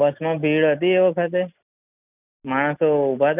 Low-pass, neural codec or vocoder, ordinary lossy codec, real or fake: 3.6 kHz; none; none; real